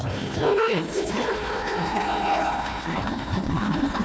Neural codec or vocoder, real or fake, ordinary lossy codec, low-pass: codec, 16 kHz, 1 kbps, FunCodec, trained on Chinese and English, 50 frames a second; fake; none; none